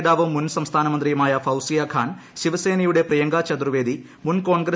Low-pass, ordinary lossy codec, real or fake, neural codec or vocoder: none; none; real; none